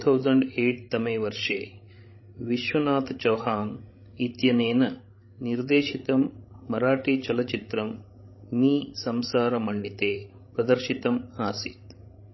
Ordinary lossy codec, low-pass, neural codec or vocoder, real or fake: MP3, 24 kbps; 7.2 kHz; codec, 16 kHz, 16 kbps, FreqCodec, larger model; fake